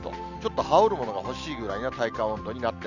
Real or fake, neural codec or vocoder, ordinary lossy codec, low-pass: real; none; none; 7.2 kHz